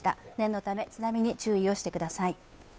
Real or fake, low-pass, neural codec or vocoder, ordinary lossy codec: fake; none; codec, 16 kHz, 2 kbps, FunCodec, trained on Chinese and English, 25 frames a second; none